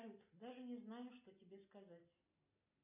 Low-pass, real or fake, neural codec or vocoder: 3.6 kHz; real; none